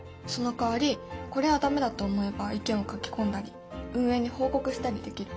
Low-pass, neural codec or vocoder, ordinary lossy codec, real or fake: none; none; none; real